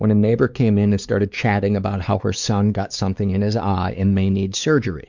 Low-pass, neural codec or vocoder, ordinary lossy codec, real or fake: 7.2 kHz; codec, 16 kHz, 4 kbps, X-Codec, WavLM features, trained on Multilingual LibriSpeech; Opus, 64 kbps; fake